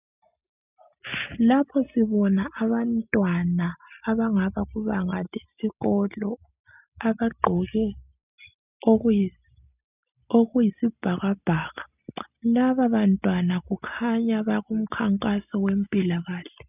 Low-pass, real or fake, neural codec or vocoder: 3.6 kHz; real; none